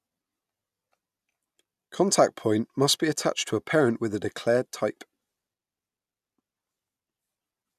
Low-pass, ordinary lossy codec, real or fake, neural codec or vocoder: 14.4 kHz; none; real; none